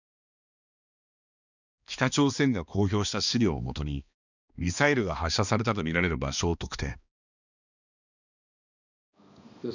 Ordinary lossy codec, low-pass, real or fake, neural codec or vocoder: none; 7.2 kHz; fake; codec, 16 kHz, 2 kbps, X-Codec, HuBERT features, trained on balanced general audio